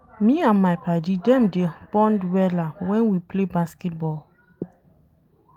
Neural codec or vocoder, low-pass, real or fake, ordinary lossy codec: none; 19.8 kHz; real; Opus, 32 kbps